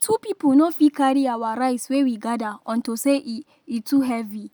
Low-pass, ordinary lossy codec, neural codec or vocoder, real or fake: none; none; none; real